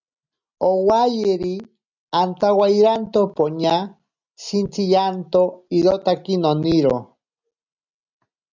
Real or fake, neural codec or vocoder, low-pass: real; none; 7.2 kHz